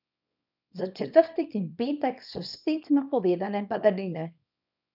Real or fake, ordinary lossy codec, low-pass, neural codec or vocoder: fake; none; 5.4 kHz; codec, 24 kHz, 0.9 kbps, WavTokenizer, small release